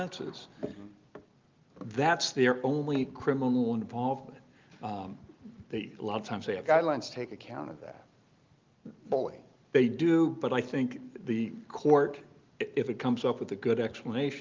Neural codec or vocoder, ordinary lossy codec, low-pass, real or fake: none; Opus, 32 kbps; 7.2 kHz; real